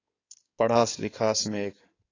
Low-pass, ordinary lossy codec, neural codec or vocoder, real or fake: 7.2 kHz; AAC, 32 kbps; codec, 16 kHz in and 24 kHz out, 2.2 kbps, FireRedTTS-2 codec; fake